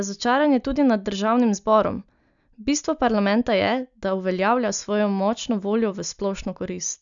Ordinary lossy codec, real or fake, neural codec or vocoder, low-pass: none; real; none; 7.2 kHz